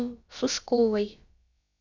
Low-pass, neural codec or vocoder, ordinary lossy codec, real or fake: 7.2 kHz; codec, 16 kHz, about 1 kbps, DyCAST, with the encoder's durations; MP3, 64 kbps; fake